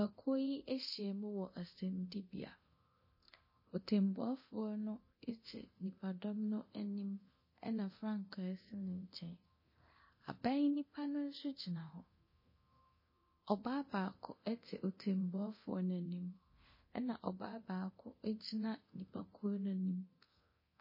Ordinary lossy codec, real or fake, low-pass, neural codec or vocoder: MP3, 24 kbps; fake; 5.4 kHz; codec, 24 kHz, 0.9 kbps, DualCodec